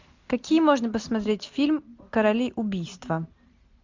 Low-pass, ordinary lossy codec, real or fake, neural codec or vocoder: 7.2 kHz; MP3, 64 kbps; real; none